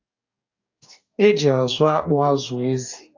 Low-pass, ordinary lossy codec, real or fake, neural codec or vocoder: 7.2 kHz; AAC, 48 kbps; fake; codec, 44.1 kHz, 2.6 kbps, DAC